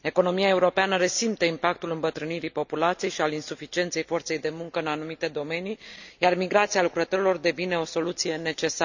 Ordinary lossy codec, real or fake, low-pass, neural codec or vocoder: none; real; 7.2 kHz; none